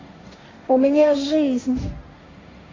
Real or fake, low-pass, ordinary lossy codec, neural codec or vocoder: fake; 7.2 kHz; AAC, 32 kbps; codec, 16 kHz, 1.1 kbps, Voila-Tokenizer